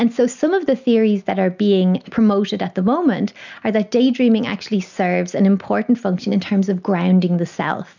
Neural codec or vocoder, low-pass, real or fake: none; 7.2 kHz; real